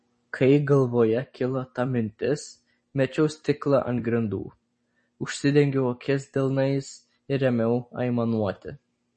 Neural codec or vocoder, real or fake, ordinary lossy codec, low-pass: vocoder, 44.1 kHz, 128 mel bands every 256 samples, BigVGAN v2; fake; MP3, 32 kbps; 10.8 kHz